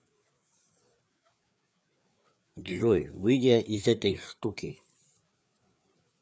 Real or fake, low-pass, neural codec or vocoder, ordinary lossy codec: fake; none; codec, 16 kHz, 4 kbps, FreqCodec, larger model; none